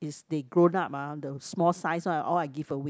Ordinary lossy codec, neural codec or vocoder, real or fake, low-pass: none; none; real; none